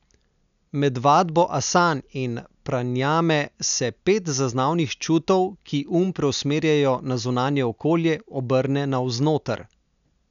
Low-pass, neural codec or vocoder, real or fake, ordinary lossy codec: 7.2 kHz; none; real; none